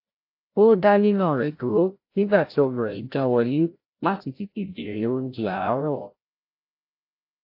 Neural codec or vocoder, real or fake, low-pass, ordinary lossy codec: codec, 16 kHz, 0.5 kbps, FreqCodec, larger model; fake; 5.4 kHz; AAC, 32 kbps